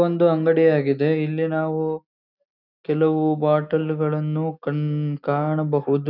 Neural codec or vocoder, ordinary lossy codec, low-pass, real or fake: none; none; 5.4 kHz; real